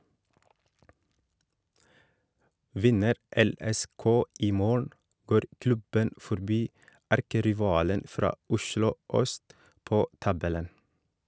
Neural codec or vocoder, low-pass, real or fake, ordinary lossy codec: none; none; real; none